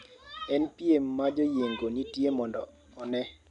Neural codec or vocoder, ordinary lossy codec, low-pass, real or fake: none; none; 10.8 kHz; real